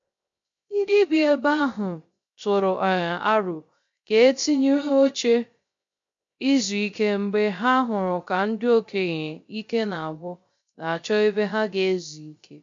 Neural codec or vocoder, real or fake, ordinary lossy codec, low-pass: codec, 16 kHz, 0.3 kbps, FocalCodec; fake; MP3, 48 kbps; 7.2 kHz